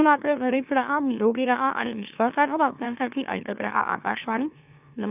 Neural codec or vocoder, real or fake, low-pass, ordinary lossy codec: autoencoder, 44.1 kHz, a latent of 192 numbers a frame, MeloTTS; fake; 3.6 kHz; none